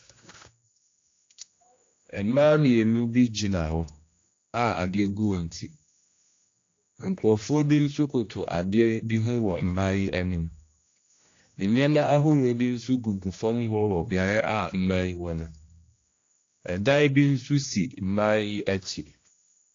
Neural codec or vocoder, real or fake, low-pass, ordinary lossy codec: codec, 16 kHz, 1 kbps, X-Codec, HuBERT features, trained on general audio; fake; 7.2 kHz; AAC, 48 kbps